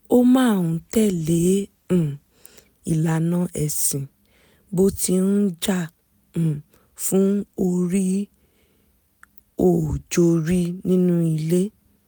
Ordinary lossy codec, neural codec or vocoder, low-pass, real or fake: none; none; none; real